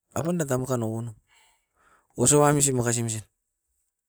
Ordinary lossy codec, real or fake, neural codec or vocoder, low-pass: none; real; none; none